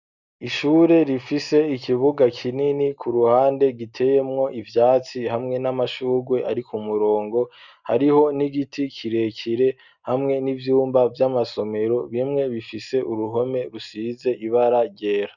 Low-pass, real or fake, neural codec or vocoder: 7.2 kHz; real; none